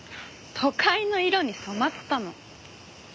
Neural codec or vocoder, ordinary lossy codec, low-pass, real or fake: none; none; none; real